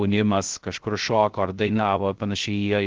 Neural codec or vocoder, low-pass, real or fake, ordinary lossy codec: codec, 16 kHz, 0.3 kbps, FocalCodec; 7.2 kHz; fake; Opus, 16 kbps